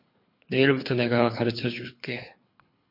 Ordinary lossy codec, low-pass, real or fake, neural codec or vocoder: MP3, 32 kbps; 5.4 kHz; fake; codec, 24 kHz, 3 kbps, HILCodec